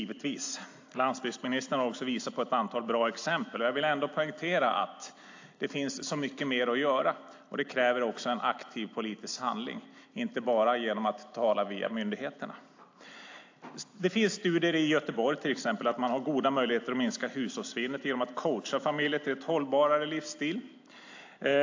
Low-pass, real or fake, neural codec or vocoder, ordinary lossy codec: 7.2 kHz; real; none; AAC, 48 kbps